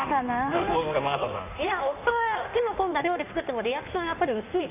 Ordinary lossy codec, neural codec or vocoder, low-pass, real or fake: none; codec, 16 kHz in and 24 kHz out, 1.1 kbps, FireRedTTS-2 codec; 3.6 kHz; fake